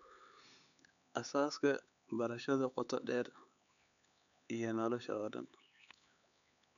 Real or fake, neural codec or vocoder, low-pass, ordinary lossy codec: fake; codec, 16 kHz, 4 kbps, X-Codec, HuBERT features, trained on LibriSpeech; 7.2 kHz; none